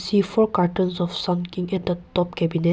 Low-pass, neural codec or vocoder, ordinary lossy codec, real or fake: none; none; none; real